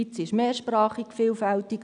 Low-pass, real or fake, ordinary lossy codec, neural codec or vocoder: 9.9 kHz; real; none; none